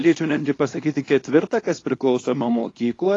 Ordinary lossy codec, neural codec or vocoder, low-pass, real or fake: AAC, 32 kbps; codec, 24 kHz, 0.9 kbps, WavTokenizer, small release; 10.8 kHz; fake